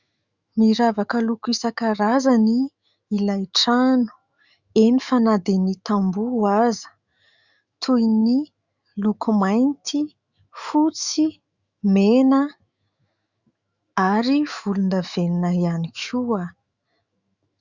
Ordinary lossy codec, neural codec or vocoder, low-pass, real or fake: Opus, 64 kbps; autoencoder, 48 kHz, 128 numbers a frame, DAC-VAE, trained on Japanese speech; 7.2 kHz; fake